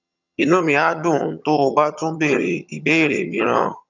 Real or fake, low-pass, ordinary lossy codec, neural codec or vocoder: fake; 7.2 kHz; none; vocoder, 22.05 kHz, 80 mel bands, HiFi-GAN